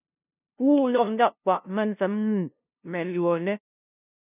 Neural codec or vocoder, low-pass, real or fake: codec, 16 kHz, 0.5 kbps, FunCodec, trained on LibriTTS, 25 frames a second; 3.6 kHz; fake